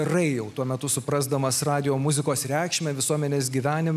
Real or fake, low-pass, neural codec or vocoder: real; 14.4 kHz; none